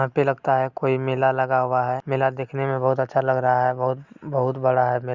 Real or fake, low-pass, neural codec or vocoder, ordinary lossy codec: real; 7.2 kHz; none; Opus, 64 kbps